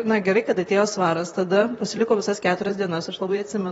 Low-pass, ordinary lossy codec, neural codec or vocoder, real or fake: 19.8 kHz; AAC, 24 kbps; vocoder, 44.1 kHz, 128 mel bands, Pupu-Vocoder; fake